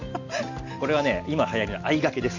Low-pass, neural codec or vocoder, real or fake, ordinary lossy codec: 7.2 kHz; none; real; Opus, 64 kbps